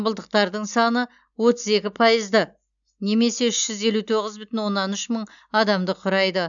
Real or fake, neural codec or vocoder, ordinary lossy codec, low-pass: real; none; none; 7.2 kHz